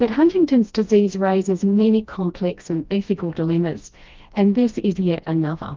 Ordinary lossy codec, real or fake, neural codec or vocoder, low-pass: Opus, 24 kbps; fake; codec, 16 kHz, 1 kbps, FreqCodec, smaller model; 7.2 kHz